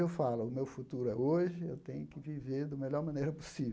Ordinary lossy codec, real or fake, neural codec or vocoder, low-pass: none; real; none; none